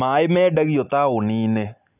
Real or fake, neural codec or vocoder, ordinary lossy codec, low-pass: real; none; none; 3.6 kHz